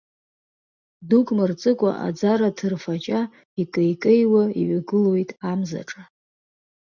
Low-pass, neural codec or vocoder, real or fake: 7.2 kHz; none; real